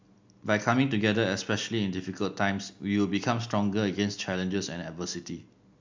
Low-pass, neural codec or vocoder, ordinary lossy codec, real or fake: 7.2 kHz; none; MP3, 64 kbps; real